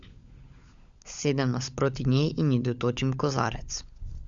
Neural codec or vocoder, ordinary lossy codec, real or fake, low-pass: codec, 16 kHz, 16 kbps, FunCodec, trained on LibriTTS, 50 frames a second; Opus, 64 kbps; fake; 7.2 kHz